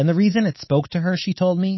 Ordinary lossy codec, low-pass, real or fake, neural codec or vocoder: MP3, 24 kbps; 7.2 kHz; fake; codec, 24 kHz, 3.1 kbps, DualCodec